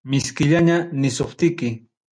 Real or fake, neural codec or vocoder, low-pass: real; none; 9.9 kHz